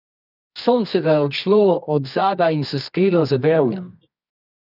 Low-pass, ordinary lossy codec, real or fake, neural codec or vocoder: 5.4 kHz; none; fake; codec, 24 kHz, 0.9 kbps, WavTokenizer, medium music audio release